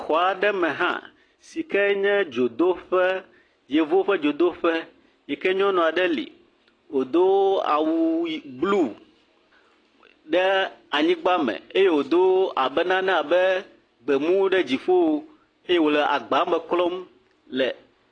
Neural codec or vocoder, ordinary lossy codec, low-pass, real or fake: none; AAC, 32 kbps; 9.9 kHz; real